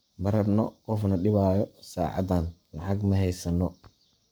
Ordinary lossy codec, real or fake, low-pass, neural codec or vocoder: none; fake; none; codec, 44.1 kHz, 7.8 kbps, Pupu-Codec